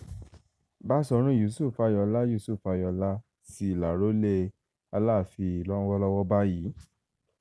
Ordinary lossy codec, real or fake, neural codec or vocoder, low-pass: none; real; none; none